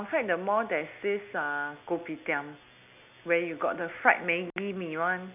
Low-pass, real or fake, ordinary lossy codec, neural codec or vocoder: 3.6 kHz; real; none; none